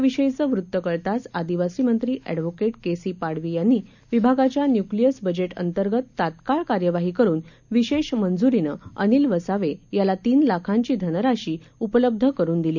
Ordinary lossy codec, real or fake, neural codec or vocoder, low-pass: none; real; none; 7.2 kHz